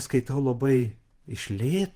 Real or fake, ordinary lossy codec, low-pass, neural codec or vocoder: fake; Opus, 24 kbps; 14.4 kHz; vocoder, 48 kHz, 128 mel bands, Vocos